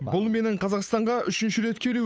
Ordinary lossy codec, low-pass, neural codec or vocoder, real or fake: none; none; codec, 16 kHz, 8 kbps, FunCodec, trained on Chinese and English, 25 frames a second; fake